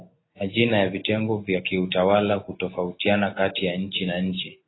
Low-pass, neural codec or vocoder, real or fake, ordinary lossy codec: 7.2 kHz; none; real; AAC, 16 kbps